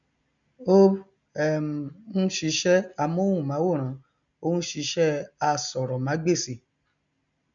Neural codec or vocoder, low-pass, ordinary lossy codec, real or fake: none; 7.2 kHz; none; real